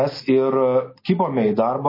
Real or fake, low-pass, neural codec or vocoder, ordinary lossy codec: real; 5.4 kHz; none; MP3, 24 kbps